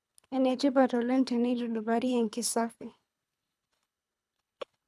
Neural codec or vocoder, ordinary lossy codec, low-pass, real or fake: codec, 24 kHz, 3 kbps, HILCodec; none; none; fake